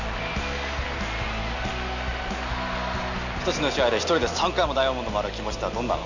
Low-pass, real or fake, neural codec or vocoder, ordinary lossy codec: 7.2 kHz; real; none; none